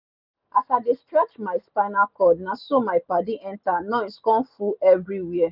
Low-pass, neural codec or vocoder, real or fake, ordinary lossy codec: 5.4 kHz; none; real; none